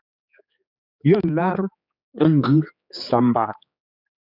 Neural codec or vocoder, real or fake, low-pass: codec, 16 kHz, 4 kbps, X-Codec, HuBERT features, trained on general audio; fake; 5.4 kHz